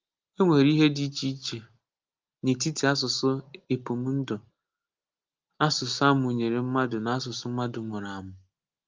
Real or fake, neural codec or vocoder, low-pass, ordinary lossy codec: real; none; 7.2 kHz; Opus, 32 kbps